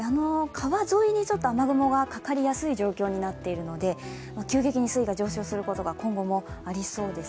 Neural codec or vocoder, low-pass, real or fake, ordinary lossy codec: none; none; real; none